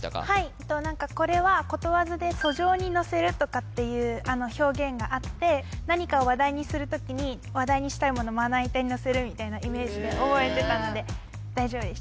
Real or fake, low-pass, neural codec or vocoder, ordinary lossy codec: real; none; none; none